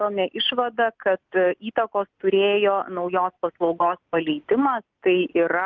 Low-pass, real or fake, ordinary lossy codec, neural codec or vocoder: 7.2 kHz; real; Opus, 24 kbps; none